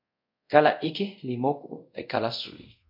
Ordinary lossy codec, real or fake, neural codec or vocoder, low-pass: MP3, 48 kbps; fake; codec, 24 kHz, 0.5 kbps, DualCodec; 5.4 kHz